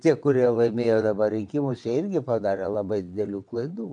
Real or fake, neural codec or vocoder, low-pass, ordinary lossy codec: fake; vocoder, 22.05 kHz, 80 mel bands, WaveNeXt; 9.9 kHz; MP3, 64 kbps